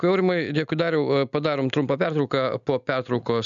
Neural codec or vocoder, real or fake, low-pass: none; real; 7.2 kHz